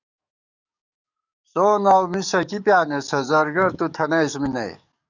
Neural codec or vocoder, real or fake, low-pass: codec, 44.1 kHz, 7.8 kbps, DAC; fake; 7.2 kHz